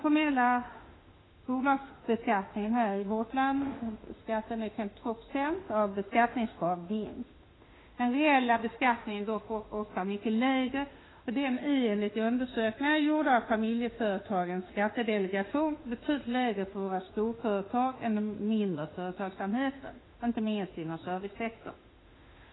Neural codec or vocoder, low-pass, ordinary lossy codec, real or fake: autoencoder, 48 kHz, 32 numbers a frame, DAC-VAE, trained on Japanese speech; 7.2 kHz; AAC, 16 kbps; fake